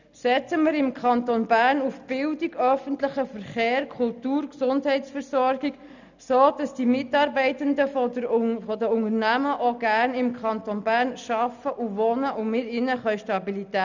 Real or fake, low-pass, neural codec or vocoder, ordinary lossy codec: real; 7.2 kHz; none; none